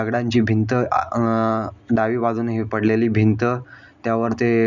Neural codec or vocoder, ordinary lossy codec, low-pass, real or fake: none; none; 7.2 kHz; real